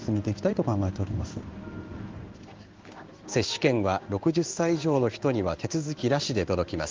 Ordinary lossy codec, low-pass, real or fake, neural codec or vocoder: Opus, 32 kbps; 7.2 kHz; fake; codec, 16 kHz in and 24 kHz out, 1 kbps, XY-Tokenizer